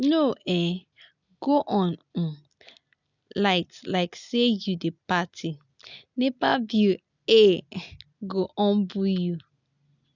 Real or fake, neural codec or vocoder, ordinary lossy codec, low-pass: real; none; none; 7.2 kHz